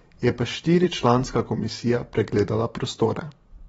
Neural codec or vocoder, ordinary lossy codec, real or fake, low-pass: vocoder, 44.1 kHz, 128 mel bands every 512 samples, BigVGAN v2; AAC, 24 kbps; fake; 19.8 kHz